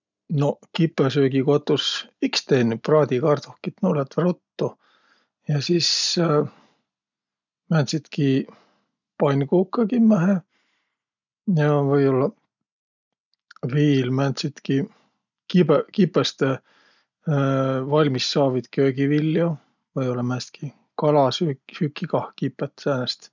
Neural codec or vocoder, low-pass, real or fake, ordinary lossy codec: none; 7.2 kHz; real; none